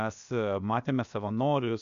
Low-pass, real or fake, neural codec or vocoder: 7.2 kHz; fake; codec, 16 kHz, 0.7 kbps, FocalCodec